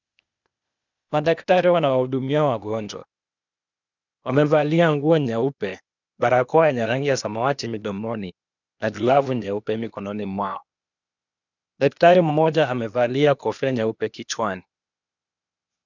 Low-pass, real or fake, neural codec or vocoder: 7.2 kHz; fake; codec, 16 kHz, 0.8 kbps, ZipCodec